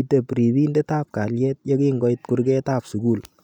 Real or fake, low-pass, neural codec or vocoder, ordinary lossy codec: real; 19.8 kHz; none; none